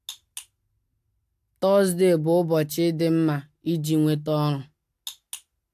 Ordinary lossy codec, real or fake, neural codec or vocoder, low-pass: AAC, 96 kbps; real; none; 14.4 kHz